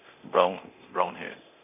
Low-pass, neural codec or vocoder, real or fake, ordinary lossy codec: 3.6 kHz; codec, 24 kHz, 0.9 kbps, DualCodec; fake; none